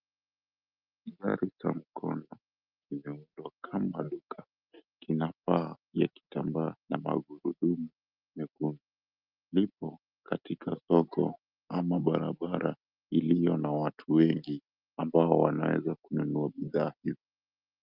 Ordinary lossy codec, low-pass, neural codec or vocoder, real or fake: Opus, 24 kbps; 5.4 kHz; none; real